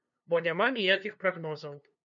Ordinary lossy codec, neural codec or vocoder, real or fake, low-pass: MP3, 96 kbps; codec, 16 kHz, 2 kbps, FunCodec, trained on LibriTTS, 25 frames a second; fake; 7.2 kHz